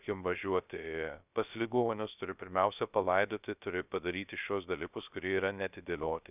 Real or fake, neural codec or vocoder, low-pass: fake; codec, 16 kHz, 0.3 kbps, FocalCodec; 3.6 kHz